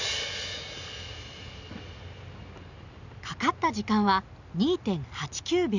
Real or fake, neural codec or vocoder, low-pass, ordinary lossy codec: real; none; 7.2 kHz; none